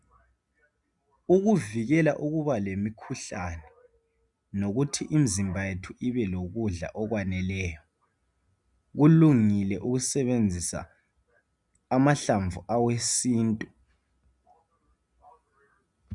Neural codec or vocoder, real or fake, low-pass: none; real; 10.8 kHz